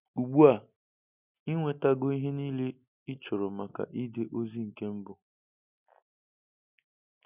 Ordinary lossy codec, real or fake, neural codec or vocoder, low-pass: none; real; none; 3.6 kHz